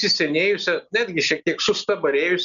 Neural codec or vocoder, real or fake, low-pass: none; real; 7.2 kHz